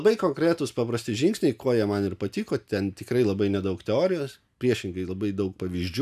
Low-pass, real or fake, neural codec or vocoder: 14.4 kHz; real; none